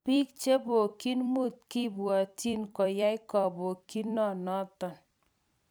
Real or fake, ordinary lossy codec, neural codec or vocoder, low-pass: fake; none; vocoder, 44.1 kHz, 128 mel bands every 256 samples, BigVGAN v2; none